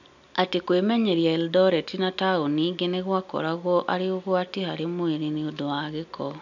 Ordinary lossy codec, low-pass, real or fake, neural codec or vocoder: none; 7.2 kHz; real; none